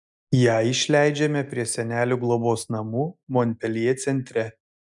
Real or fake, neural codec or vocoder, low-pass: real; none; 10.8 kHz